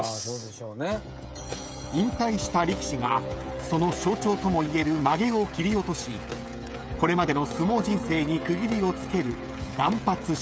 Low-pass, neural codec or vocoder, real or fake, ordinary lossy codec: none; codec, 16 kHz, 16 kbps, FreqCodec, smaller model; fake; none